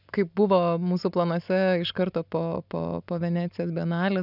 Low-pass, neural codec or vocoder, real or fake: 5.4 kHz; none; real